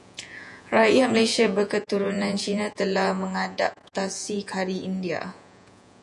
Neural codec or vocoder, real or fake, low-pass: vocoder, 48 kHz, 128 mel bands, Vocos; fake; 10.8 kHz